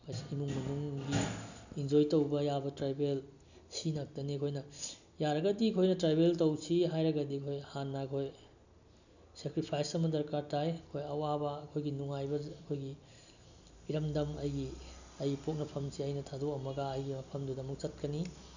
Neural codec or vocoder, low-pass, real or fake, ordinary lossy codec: none; 7.2 kHz; real; none